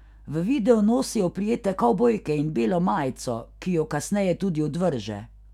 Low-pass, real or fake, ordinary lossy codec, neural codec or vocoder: 19.8 kHz; fake; none; autoencoder, 48 kHz, 128 numbers a frame, DAC-VAE, trained on Japanese speech